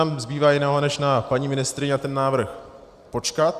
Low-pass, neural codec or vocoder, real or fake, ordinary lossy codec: 14.4 kHz; none; real; Opus, 64 kbps